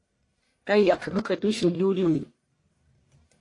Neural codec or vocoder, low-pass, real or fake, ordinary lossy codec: codec, 44.1 kHz, 1.7 kbps, Pupu-Codec; 10.8 kHz; fake; AAC, 48 kbps